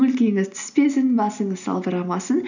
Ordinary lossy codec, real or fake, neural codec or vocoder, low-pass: none; real; none; 7.2 kHz